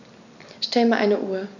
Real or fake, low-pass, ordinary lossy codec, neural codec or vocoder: real; 7.2 kHz; none; none